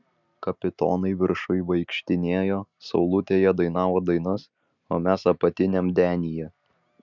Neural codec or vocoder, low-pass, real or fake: none; 7.2 kHz; real